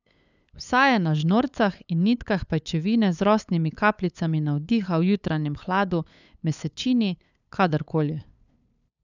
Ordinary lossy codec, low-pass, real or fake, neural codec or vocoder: none; 7.2 kHz; fake; codec, 16 kHz, 8 kbps, FunCodec, trained on LibriTTS, 25 frames a second